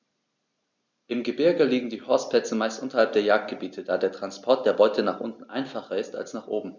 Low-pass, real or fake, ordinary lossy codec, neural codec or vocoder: 7.2 kHz; real; none; none